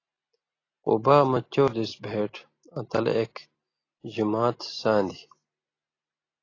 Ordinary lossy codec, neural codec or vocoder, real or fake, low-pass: AAC, 32 kbps; none; real; 7.2 kHz